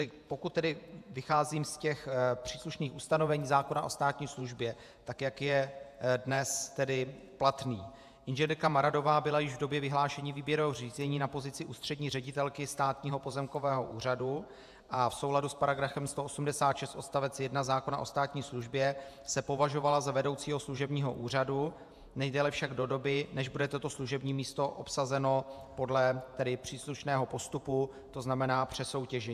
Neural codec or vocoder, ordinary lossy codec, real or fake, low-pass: vocoder, 48 kHz, 128 mel bands, Vocos; AAC, 96 kbps; fake; 14.4 kHz